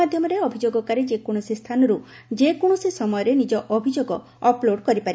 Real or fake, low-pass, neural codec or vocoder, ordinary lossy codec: real; none; none; none